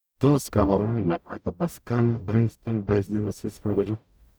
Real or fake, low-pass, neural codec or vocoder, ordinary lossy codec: fake; none; codec, 44.1 kHz, 0.9 kbps, DAC; none